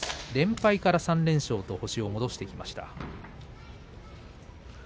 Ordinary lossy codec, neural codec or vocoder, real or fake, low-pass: none; none; real; none